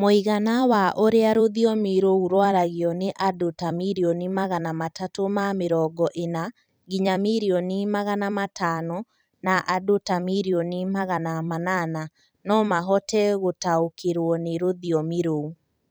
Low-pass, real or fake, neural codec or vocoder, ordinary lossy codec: none; fake; vocoder, 44.1 kHz, 128 mel bands every 256 samples, BigVGAN v2; none